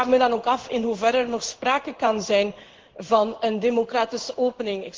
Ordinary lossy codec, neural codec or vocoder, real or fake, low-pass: Opus, 16 kbps; codec, 16 kHz in and 24 kHz out, 1 kbps, XY-Tokenizer; fake; 7.2 kHz